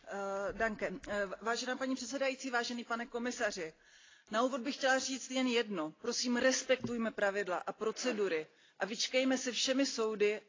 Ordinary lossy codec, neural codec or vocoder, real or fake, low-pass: AAC, 32 kbps; none; real; 7.2 kHz